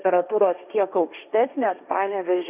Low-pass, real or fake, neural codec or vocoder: 3.6 kHz; fake; codec, 16 kHz, 1.1 kbps, Voila-Tokenizer